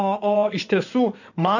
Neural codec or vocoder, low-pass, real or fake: codec, 16 kHz in and 24 kHz out, 2.2 kbps, FireRedTTS-2 codec; 7.2 kHz; fake